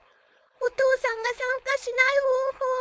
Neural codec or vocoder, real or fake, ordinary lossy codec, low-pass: codec, 16 kHz, 4.8 kbps, FACodec; fake; none; none